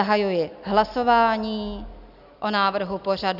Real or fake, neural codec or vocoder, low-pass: real; none; 5.4 kHz